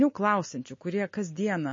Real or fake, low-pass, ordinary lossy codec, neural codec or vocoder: real; 7.2 kHz; MP3, 32 kbps; none